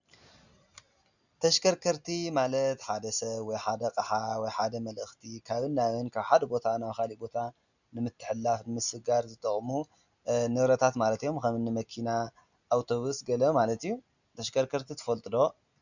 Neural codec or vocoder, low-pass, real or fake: none; 7.2 kHz; real